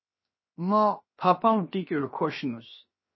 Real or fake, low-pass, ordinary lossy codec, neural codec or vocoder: fake; 7.2 kHz; MP3, 24 kbps; codec, 16 kHz, 0.7 kbps, FocalCodec